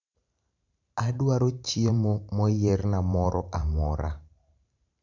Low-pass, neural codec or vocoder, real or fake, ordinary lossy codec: 7.2 kHz; vocoder, 44.1 kHz, 128 mel bands every 512 samples, BigVGAN v2; fake; none